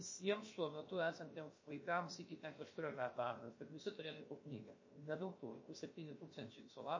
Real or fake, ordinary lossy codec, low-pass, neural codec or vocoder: fake; MP3, 32 kbps; 7.2 kHz; codec, 16 kHz, about 1 kbps, DyCAST, with the encoder's durations